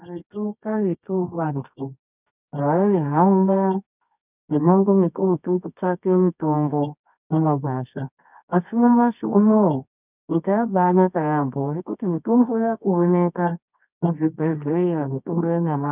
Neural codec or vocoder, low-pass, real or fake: codec, 24 kHz, 0.9 kbps, WavTokenizer, medium music audio release; 3.6 kHz; fake